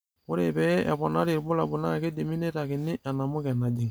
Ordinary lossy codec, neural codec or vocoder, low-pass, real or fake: none; none; none; real